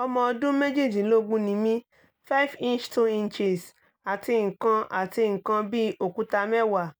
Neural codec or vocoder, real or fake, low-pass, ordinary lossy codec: none; real; none; none